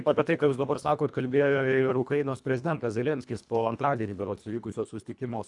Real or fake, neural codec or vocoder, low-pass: fake; codec, 24 kHz, 1.5 kbps, HILCodec; 10.8 kHz